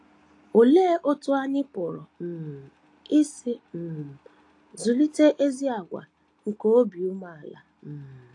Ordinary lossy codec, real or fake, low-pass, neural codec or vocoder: AAC, 48 kbps; real; 10.8 kHz; none